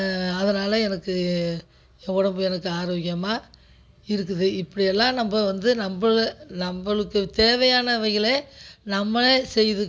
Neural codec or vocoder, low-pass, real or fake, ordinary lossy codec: none; none; real; none